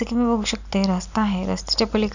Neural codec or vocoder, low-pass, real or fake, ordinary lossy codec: none; 7.2 kHz; real; none